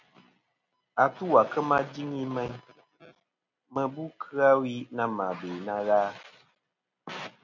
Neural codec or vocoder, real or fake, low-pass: none; real; 7.2 kHz